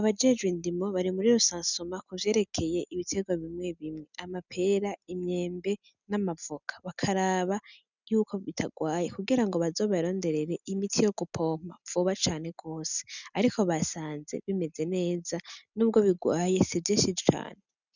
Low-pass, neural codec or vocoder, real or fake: 7.2 kHz; none; real